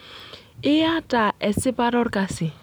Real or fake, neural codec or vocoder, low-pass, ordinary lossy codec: real; none; none; none